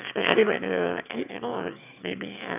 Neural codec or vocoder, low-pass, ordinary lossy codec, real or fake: autoencoder, 22.05 kHz, a latent of 192 numbers a frame, VITS, trained on one speaker; 3.6 kHz; none; fake